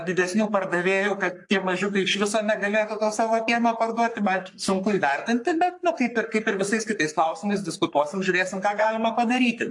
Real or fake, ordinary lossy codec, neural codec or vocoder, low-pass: fake; AAC, 64 kbps; codec, 44.1 kHz, 3.4 kbps, Pupu-Codec; 10.8 kHz